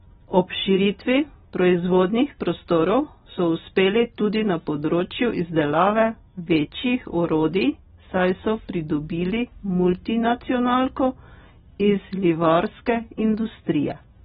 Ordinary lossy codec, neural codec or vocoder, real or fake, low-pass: AAC, 16 kbps; none; real; 19.8 kHz